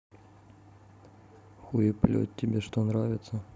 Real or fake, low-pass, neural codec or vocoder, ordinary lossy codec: real; none; none; none